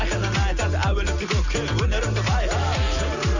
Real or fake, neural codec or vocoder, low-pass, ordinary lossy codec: real; none; 7.2 kHz; none